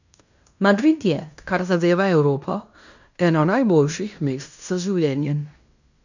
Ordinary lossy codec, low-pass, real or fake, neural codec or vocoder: none; 7.2 kHz; fake; codec, 16 kHz in and 24 kHz out, 0.9 kbps, LongCat-Audio-Codec, fine tuned four codebook decoder